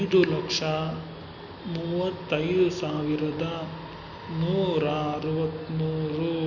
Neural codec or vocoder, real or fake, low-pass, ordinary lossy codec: none; real; 7.2 kHz; none